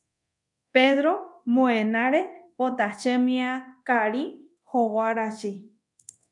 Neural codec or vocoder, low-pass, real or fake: codec, 24 kHz, 0.9 kbps, DualCodec; 10.8 kHz; fake